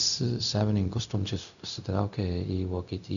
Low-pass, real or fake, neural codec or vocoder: 7.2 kHz; fake; codec, 16 kHz, 0.4 kbps, LongCat-Audio-Codec